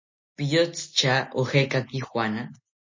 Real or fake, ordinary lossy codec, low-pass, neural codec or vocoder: real; MP3, 32 kbps; 7.2 kHz; none